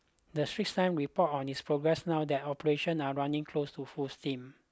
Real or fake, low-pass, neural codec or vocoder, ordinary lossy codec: real; none; none; none